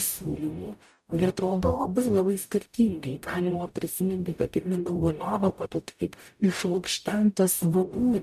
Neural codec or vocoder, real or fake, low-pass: codec, 44.1 kHz, 0.9 kbps, DAC; fake; 14.4 kHz